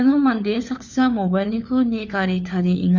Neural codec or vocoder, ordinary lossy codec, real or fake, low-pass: codec, 16 kHz, 4 kbps, FunCodec, trained on LibriTTS, 50 frames a second; MP3, 64 kbps; fake; 7.2 kHz